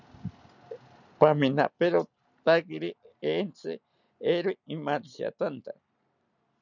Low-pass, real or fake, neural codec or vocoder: 7.2 kHz; real; none